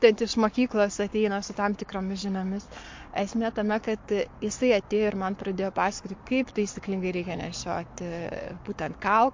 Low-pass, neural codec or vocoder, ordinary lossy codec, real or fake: 7.2 kHz; codec, 44.1 kHz, 7.8 kbps, Pupu-Codec; MP3, 48 kbps; fake